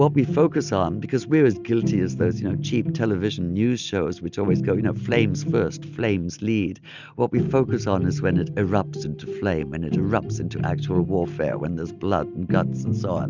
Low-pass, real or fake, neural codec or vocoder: 7.2 kHz; real; none